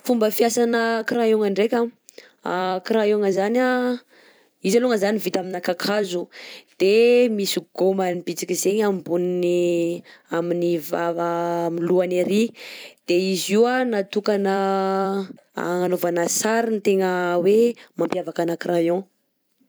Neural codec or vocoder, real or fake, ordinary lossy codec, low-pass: vocoder, 44.1 kHz, 128 mel bands every 256 samples, BigVGAN v2; fake; none; none